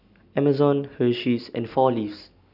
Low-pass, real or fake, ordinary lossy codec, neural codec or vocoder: 5.4 kHz; real; none; none